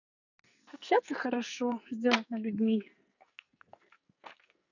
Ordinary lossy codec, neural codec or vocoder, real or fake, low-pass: none; codec, 44.1 kHz, 2.6 kbps, SNAC; fake; 7.2 kHz